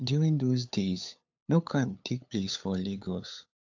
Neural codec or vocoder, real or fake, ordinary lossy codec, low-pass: codec, 16 kHz, 8 kbps, FunCodec, trained on LibriTTS, 25 frames a second; fake; AAC, 48 kbps; 7.2 kHz